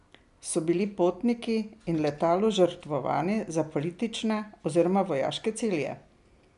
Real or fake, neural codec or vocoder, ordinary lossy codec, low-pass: real; none; none; 10.8 kHz